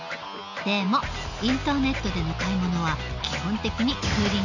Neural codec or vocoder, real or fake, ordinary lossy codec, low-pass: none; real; none; 7.2 kHz